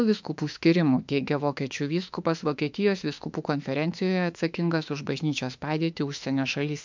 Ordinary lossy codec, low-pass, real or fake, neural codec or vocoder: MP3, 64 kbps; 7.2 kHz; fake; autoencoder, 48 kHz, 32 numbers a frame, DAC-VAE, trained on Japanese speech